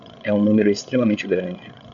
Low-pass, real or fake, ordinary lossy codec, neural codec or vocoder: 7.2 kHz; fake; MP3, 64 kbps; codec, 16 kHz, 8 kbps, FreqCodec, larger model